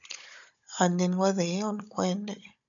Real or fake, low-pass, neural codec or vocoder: fake; 7.2 kHz; codec, 16 kHz, 16 kbps, FunCodec, trained on Chinese and English, 50 frames a second